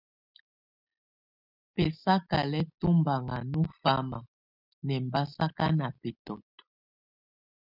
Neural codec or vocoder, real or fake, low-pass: none; real; 5.4 kHz